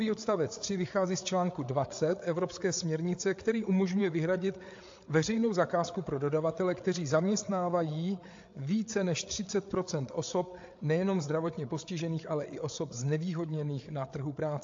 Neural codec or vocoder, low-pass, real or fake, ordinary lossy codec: codec, 16 kHz, 8 kbps, FreqCodec, larger model; 7.2 kHz; fake; AAC, 48 kbps